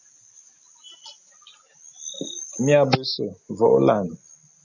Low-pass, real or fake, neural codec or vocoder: 7.2 kHz; real; none